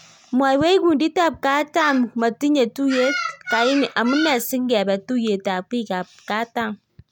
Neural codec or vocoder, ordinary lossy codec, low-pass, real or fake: none; none; 19.8 kHz; real